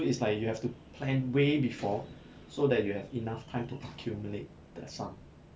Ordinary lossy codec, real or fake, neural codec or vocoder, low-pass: none; real; none; none